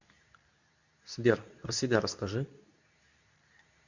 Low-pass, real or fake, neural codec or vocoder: 7.2 kHz; fake; codec, 24 kHz, 0.9 kbps, WavTokenizer, medium speech release version 2